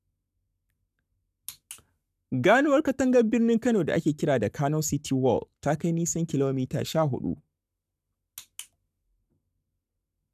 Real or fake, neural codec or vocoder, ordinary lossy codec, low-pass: fake; codec, 44.1 kHz, 7.8 kbps, Pupu-Codec; none; 14.4 kHz